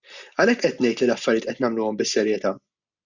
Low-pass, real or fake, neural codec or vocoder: 7.2 kHz; real; none